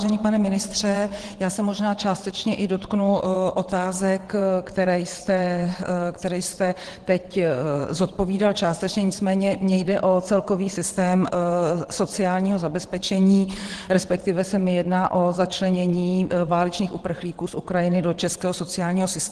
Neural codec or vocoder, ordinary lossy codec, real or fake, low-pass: vocoder, 22.05 kHz, 80 mel bands, WaveNeXt; Opus, 16 kbps; fake; 9.9 kHz